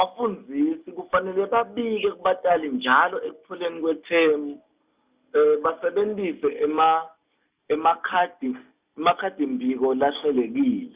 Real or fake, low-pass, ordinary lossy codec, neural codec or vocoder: real; 3.6 kHz; Opus, 64 kbps; none